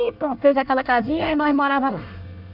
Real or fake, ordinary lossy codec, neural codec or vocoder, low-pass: fake; none; codec, 24 kHz, 1 kbps, SNAC; 5.4 kHz